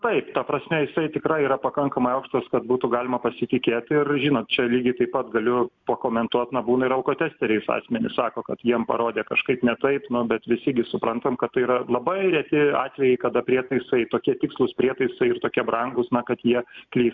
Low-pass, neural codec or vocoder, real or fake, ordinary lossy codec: 7.2 kHz; none; real; MP3, 64 kbps